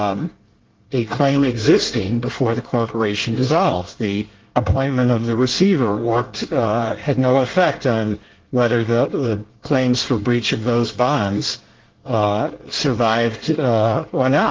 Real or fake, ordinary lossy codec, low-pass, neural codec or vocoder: fake; Opus, 16 kbps; 7.2 kHz; codec, 24 kHz, 1 kbps, SNAC